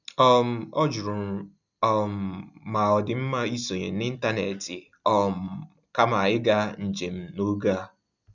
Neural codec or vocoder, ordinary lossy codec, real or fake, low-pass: none; none; real; 7.2 kHz